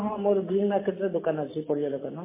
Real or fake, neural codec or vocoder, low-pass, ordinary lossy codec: real; none; 3.6 kHz; MP3, 16 kbps